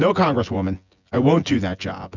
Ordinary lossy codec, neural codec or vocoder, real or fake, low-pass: Opus, 64 kbps; vocoder, 24 kHz, 100 mel bands, Vocos; fake; 7.2 kHz